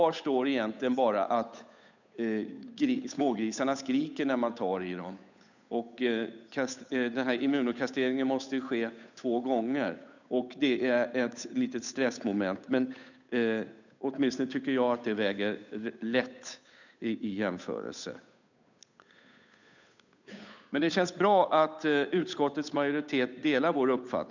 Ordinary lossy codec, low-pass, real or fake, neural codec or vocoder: none; 7.2 kHz; fake; codec, 16 kHz, 8 kbps, FunCodec, trained on Chinese and English, 25 frames a second